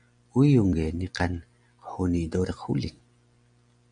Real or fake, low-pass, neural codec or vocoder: real; 9.9 kHz; none